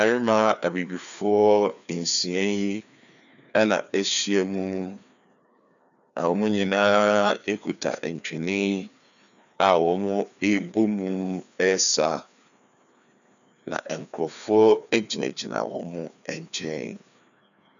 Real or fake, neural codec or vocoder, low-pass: fake; codec, 16 kHz, 2 kbps, FreqCodec, larger model; 7.2 kHz